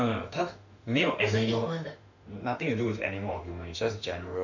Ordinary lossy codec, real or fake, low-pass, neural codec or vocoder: none; fake; 7.2 kHz; autoencoder, 48 kHz, 32 numbers a frame, DAC-VAE, trained on Japanese speech